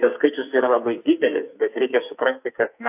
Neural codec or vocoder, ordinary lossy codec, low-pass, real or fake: codec, 44.1 kHz, 2.6 kbps, SNAC; AAC, 32 kbps; 3.6 kHz; fake